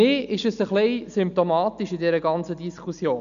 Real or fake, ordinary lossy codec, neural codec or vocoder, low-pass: real; none; none; 7.2 kHz